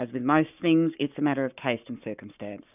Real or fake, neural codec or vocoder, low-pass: fake; codec, 44.1 kHz, 7.8 kbps, Pupu-Codec; 3.6 kHz